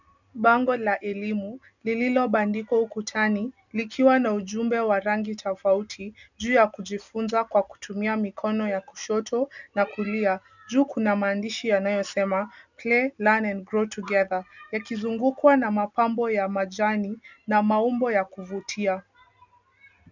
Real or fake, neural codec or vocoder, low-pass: real; none; 7.2 kHz